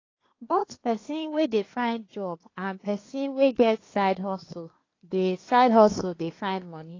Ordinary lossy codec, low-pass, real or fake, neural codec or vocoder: AAC, 32 kbps; 7.2 kHz; fake; codec, 24 kHz, 1 kbps, SNAC